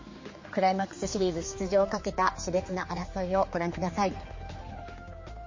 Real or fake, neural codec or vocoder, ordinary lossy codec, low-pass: fake; codec, 16 kHz, 4 kbps, X-Codec, HuBERT features, trained on general audio; MP3, 32 kbps; 7.2 kHz